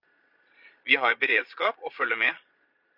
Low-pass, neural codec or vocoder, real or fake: 5.4 kHz; vocoder, 22.05 kHz, 80 mel bands, Vocos; fake